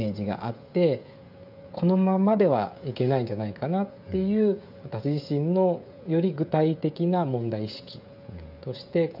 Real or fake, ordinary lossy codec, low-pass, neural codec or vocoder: fake; none; 5.4 kHz; codec, 16 kHz, 16 kbps, FreqCodec, smaller model